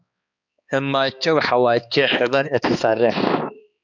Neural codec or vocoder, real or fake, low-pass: codec, 16 kHz, 2 kbps, X-Codec, HuBERT features, trained on balanced general audio; fake; 7.2 kHz